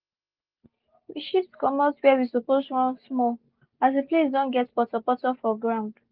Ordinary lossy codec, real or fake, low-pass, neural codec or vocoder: Opus, 24 kbps; real; 5.4 kHz; none